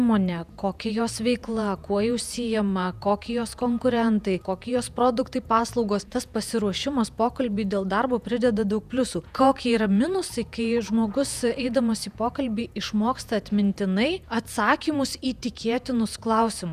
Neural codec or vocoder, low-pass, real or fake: vocoder, 48 kHz, 128 mel bands, Vocos; 14.4 kHz; fake